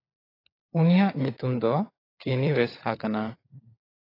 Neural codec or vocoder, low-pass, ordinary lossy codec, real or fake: codec, 16 kHz, 16 kbps, FunCodec, trained on LibriTTS, 50 frames a second; 5.4 kHz; AAC, 24 kbps; fake